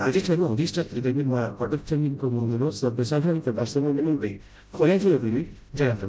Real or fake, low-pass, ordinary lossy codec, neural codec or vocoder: fake; none; none; codec, 16 kHz, 0.5 kbps, FreqCodec, smaller model